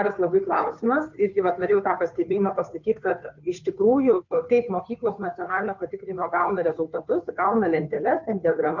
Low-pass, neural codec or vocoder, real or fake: 7.2 kHz; codec, 16 kHz, 2 kbps, FunCodec, trained on Chinese and English, 25 frames a second; fake